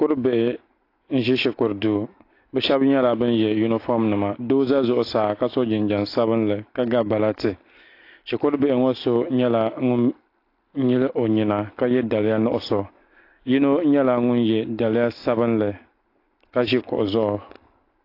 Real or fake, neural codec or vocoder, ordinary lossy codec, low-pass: real; none; AAC, 32 kbps; 5.4 kHz